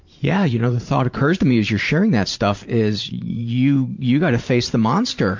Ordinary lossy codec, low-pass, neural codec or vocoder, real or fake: MP3, 48 kbps; 7.2 kHz; vocoder, 44.1 kHz, 128 mel bands every 512 samples, BigVGAN v2; fake